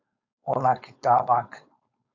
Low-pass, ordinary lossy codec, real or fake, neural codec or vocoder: 7.2 kHz; AAC, 48 kbps; fake; codec, 16 kHz, 4.8 kbps, FACodec